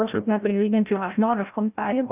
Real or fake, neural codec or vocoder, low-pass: fake; codec, 16 kHz, 0.5 kbps, FreqCodec, larger model; 3.6 kHz